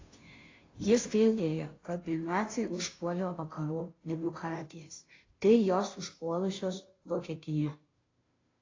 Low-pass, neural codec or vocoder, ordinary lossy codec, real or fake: 7.2 kHz; codec, 16 kHz, 0.5 kbps, FunCodec, trained on Chinese and English, 25 frames a second; AAC, 32 kbps; fake